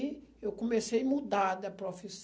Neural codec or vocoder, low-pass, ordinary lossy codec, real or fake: none; none; none; real